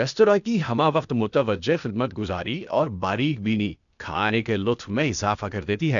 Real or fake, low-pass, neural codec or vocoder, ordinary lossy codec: fake; 7.2 kHz; codec, 16 kHz, 0.8 kbps, ZipCodec; none